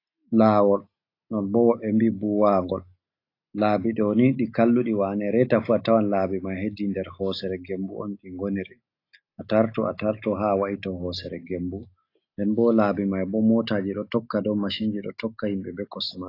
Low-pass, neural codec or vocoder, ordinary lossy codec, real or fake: 5.4 kHz; none; AAC, 32 kbps; real